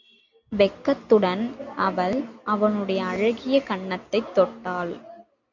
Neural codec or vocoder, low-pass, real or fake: none; 7.2 kHz; real